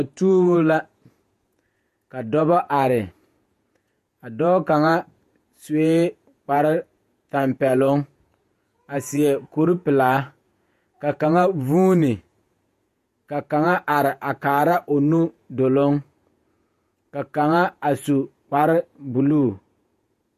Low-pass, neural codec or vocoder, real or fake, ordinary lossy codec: 14.4 kHz; vocoder, 48 kHz, 128 mel bands, Vocos; fake; MP3, 64 kbps